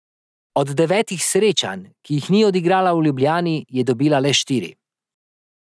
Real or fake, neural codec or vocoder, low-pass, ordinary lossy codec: real; none; none; none